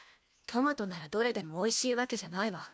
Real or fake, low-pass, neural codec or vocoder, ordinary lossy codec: fake; none; codec, 16 kHz, 1 kbps, FunCodec, trained on LibriTTS, 50 frames a second; none